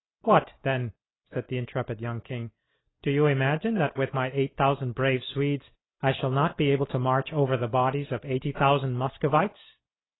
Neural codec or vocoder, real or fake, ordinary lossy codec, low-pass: none; real; AAC, 16 kbps; 7.2 kHz